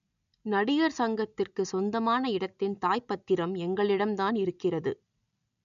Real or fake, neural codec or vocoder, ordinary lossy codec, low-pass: real; none; none; 7.2 kHz